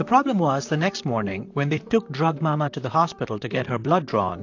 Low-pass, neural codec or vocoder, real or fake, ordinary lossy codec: 7.2 kHz; vocoder, 44.1 kHz, 128 mel bands, Pupu-Vocoder; fake; AAC, 48 kbps